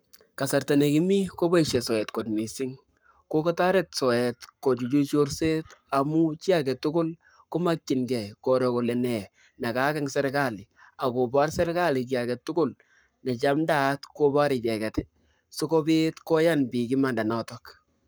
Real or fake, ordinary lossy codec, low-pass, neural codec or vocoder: fake; none; none; codec, 44.1 kHz, 7.8 kbps, Pupu-Codec